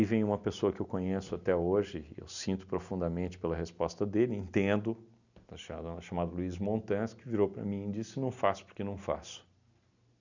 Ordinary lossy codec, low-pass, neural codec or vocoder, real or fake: none; 7.2 kHz; none; real